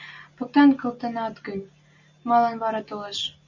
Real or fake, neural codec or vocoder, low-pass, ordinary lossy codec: real; none; 7.2 kHz; Opus, 64 kbps